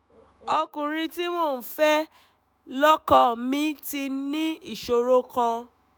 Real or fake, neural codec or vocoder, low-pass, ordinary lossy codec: fake; autoencoder, 48 kHz, 128 numbers a frame, DAC-VAE, trained on Japanese speech; none; none